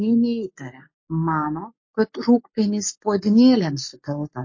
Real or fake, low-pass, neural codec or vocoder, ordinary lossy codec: fake; 7.2 kHz; codec, 44.1 kHz, 7.8 kbps, Pupu-Codec; MP3, 32 kbps